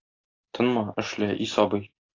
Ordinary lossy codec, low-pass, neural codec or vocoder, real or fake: AAC, 32 kbps; 7.2 kHz; none; real